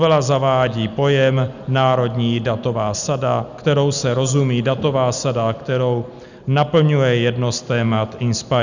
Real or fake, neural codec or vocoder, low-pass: real; none; 7.2 kHz